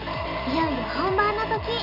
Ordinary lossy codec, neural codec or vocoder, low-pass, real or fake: none; none; 5.4 kHz; real